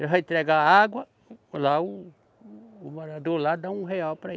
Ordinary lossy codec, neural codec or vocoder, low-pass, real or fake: none; none; none; real